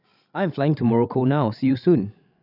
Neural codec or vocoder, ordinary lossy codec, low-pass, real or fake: codec, 16 kHz, 16 kbps, FreqCodec, larger model; none; 5.4 kHz; fake